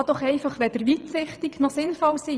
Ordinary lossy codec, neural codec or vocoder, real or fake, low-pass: none; vocoder, 22.05 kHz, 80 mel bands, WaveNeXt; fake; none